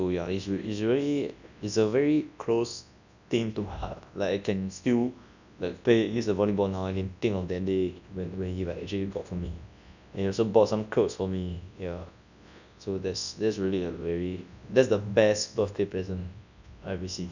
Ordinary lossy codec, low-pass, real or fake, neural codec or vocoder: none; 7.2 kHz; fake; codec, 24 kHz, 0.9 kbps, WavTokenizer, large speech release